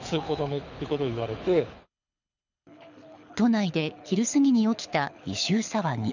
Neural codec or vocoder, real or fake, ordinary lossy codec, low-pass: codec, 24 kHz, 6 kbps, HILCodec; fake; none; 7.2 kHz